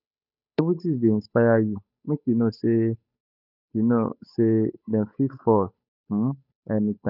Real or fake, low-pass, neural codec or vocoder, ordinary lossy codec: fake; 5.4 kHz; codec, 16 kHz, 8 kbps, FunCodec, trained on Chinese and English, 25 frames a second; none